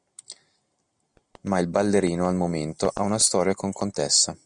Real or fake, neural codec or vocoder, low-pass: real; none; 9.9 kHz